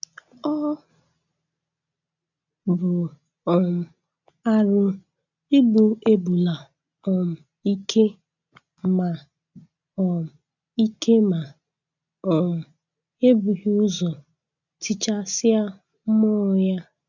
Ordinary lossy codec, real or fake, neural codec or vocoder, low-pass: none; real; none; 7.2 kHz